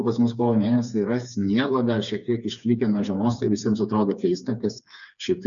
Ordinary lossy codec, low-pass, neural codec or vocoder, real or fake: MP3, 96 kbps; 7.2 kHz; codec, 16 kHz, 4 kbps, FreqCodec, smaller model; fake